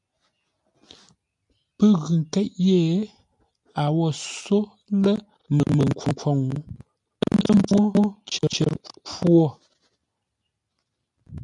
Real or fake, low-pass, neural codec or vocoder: real; 10.8 kHz; none